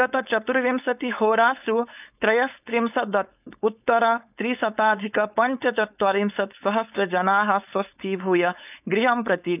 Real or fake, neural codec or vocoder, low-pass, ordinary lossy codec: fake; codec, 16 kHz, 4.8 kbps, FACodec; 3.6 kHz; none